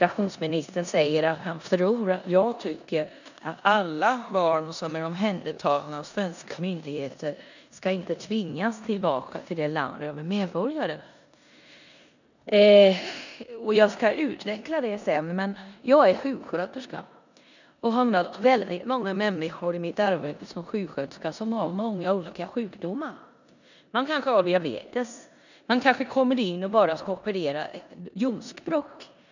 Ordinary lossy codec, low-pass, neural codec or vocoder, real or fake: none; 7.2 kHz; codec, 16 kHz in and 24 kHz out, 0.9 kbps, LongCat-Audio-Codec, four codebook decoder; fake